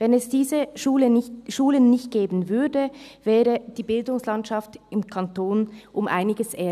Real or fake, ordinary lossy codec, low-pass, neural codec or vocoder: real; none; 14.4 kHz; none